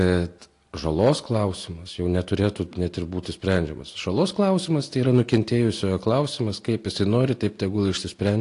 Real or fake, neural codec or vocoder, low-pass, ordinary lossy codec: real; none; 10.8 kHz; AAC, 48 kbps